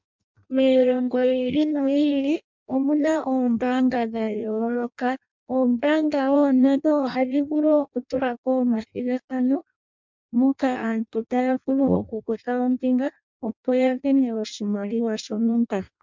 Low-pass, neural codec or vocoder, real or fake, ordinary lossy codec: 7.2 kHz; codec, 16 kHz in and 24 kHz out, 0.6 kbps, FireRedTTS-2 codec; fake; MP3, 64 kbps